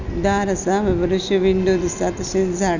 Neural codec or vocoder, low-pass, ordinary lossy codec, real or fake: none; 7.2 kHz; none; real